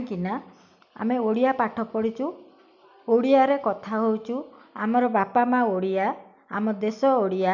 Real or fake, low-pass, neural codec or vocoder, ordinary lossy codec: real; 7.2 kHz; none; none